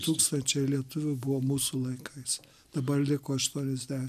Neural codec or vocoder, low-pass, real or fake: none; 14.4 kHz; real